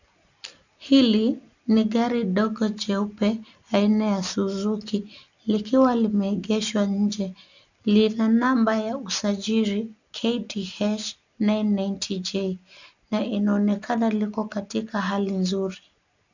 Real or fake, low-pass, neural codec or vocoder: real; 7.2 kHz; none